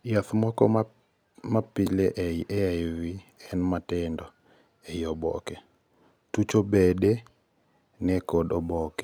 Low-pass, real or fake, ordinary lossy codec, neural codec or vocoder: none; real; none; none